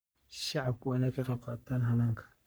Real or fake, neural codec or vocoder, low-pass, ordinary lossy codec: fake; codec, 44.1 kHz, 3.4 kbps, Pupu-Codec; none; none